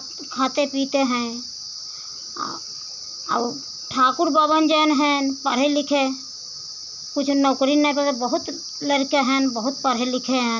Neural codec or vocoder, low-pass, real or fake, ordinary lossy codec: none; 7.2 kHz; real; none